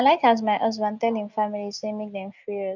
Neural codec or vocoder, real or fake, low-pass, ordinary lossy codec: none; real; 7.2 kHz; none